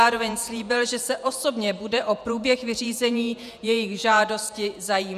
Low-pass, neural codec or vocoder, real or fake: 14.4 kHz; vocoder, 48 kHz, 128 mel bands, Vocos; fake